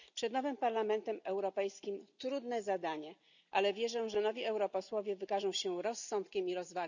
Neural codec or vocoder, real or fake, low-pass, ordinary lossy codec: none; real; 7.2 kHz; none